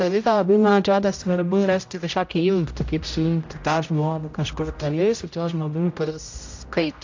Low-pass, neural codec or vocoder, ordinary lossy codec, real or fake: 7.2 kHz; codec, 16 kHz, 0.5 kbps, X-Codec, HuBERT features, trained on general audio; AAC, 48 kbps; fake